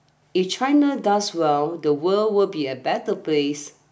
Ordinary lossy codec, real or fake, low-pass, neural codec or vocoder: none; real; none; none